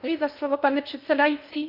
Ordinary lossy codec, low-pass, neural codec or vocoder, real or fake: MP3, 48 kbps; 5.4 kHz; codec, 16 kHz in and 24 kHz out, 0.6 kbps, FocalCodec, streaming, 2048 codes; fake